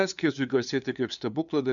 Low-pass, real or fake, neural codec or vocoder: 7.2 kHz; fake; codec, 16 kHz, 2 kbps, FunCodec, trained on LibriTTS, 25 frames a second